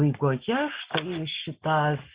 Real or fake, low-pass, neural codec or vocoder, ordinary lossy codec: real; 3.6 kHz; none; Opus, 64 kbps